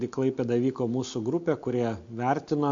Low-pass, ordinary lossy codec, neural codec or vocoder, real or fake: 7.2 kHz; MP3, 48 kbps; none; real